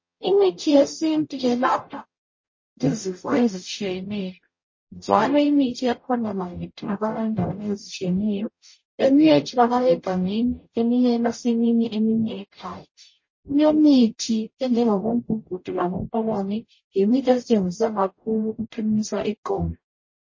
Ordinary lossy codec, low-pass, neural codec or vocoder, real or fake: MP3, 32 kbps; 7.2 kHz; codec, 44.1 kHz, 0.9 kbps, DAC; fake